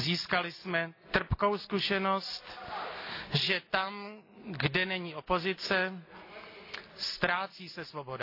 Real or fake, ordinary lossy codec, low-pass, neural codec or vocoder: real; AAC, 32 kbps; 5.4 kHz; none